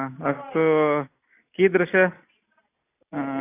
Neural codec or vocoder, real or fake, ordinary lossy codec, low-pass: none; real; AAC, 24 kbps; 3.6 kHz